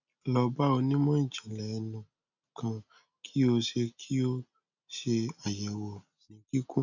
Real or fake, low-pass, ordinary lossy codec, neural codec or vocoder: real; 7.2 kHz; none; none